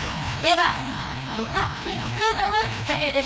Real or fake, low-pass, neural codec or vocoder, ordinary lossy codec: fake; none; codec, 16 kHz, 1 kbps, FreqCodec, larger model; none